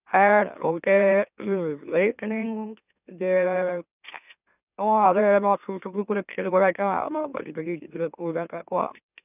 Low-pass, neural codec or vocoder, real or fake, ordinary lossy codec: 3.6 kHz; autoencoder, 44.1 kHz, a latent of 192 numbers a frame, MeloTTS; fake; none